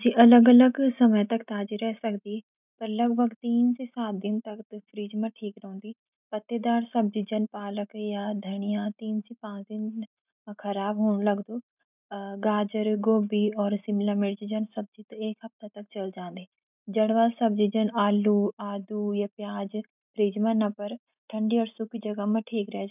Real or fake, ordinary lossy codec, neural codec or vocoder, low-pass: real; none; none; 3.6 kHz